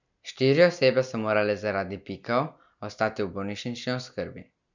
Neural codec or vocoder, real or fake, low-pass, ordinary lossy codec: none; real; 7.2 kHz; none